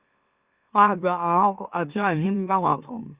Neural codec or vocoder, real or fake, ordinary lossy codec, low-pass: autoencoder, 44.1 kHz, a latent of 192 numbers a frame, MeloTTS; fake; Opus, 64 kbps; 3.6 kHz